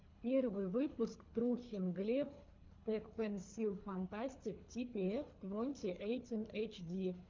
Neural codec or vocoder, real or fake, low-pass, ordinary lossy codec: codec, 24 kHz, 3 kbps, HILCodec; fake; 7.2 kHz; MP3, 64 kbps